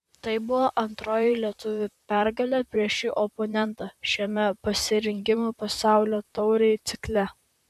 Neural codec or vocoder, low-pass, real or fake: vocoder, 44.1 kHz, 128 mel bands, Pupu-Vocoder; 14.4 kHz; fake